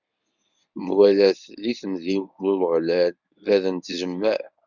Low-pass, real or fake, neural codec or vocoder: 7.2 kHz; fake; codec, 24 kHz, 0.9 kbps, WavTokenizer, medium speech release version 1